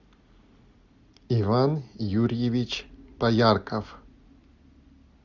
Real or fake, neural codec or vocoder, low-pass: real; none; 7.2 kHz